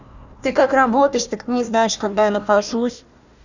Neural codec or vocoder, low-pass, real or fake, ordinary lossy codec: codec, 16 kHz, 1 kbps, FunCodec, trained on Chinese and English, 50 frames a second; 7.2 kHz; fake; none